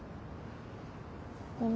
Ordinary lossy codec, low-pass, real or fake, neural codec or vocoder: none; none; real; none